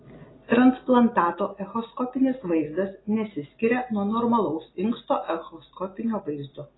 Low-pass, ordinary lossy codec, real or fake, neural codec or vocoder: 7.2 kHz; AAC, 16 kbps; real; none